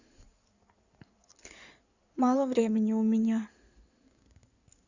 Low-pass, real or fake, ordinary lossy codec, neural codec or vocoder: 7.2 kHz; fake; Opus, 64 kbps; codec, 16 kHz in and 24 kHz out, 2.2 kbps, FireRedTTS-2 codec